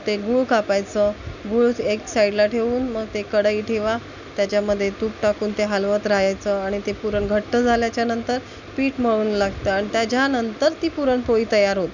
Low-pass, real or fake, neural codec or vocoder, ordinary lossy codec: 7.2 kHz; real; none; none